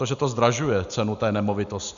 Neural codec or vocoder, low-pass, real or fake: none; 7.2 kHz; real